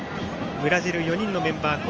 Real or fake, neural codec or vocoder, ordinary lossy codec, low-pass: real; none; Opus, 24 kbps; 7.2 kHz